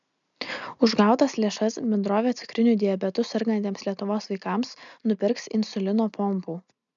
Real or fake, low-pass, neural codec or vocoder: real; 7.2 kHz; none